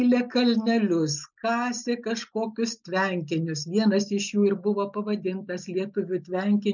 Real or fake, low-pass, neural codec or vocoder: real; 7.2 kHz; none